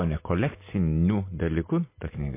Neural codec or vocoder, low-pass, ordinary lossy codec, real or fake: none; 3.6 kHz; MP3, 24 kbps; real